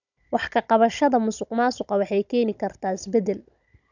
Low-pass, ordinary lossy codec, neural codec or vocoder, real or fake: 7.2 kHz; none; codec, 16 kHz, 16 kbps, FunCodec, trained on Chinese and English, 50 frames a second; fake